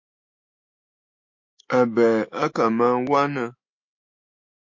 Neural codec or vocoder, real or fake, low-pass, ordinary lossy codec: autoencoder, 48 kHz, 128 numbers a frame, DAC-VAE, trained on Japanese speech; fake; 7.2 kHz; MP3, 48 kbps